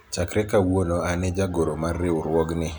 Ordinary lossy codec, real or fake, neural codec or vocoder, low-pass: none; real; none; none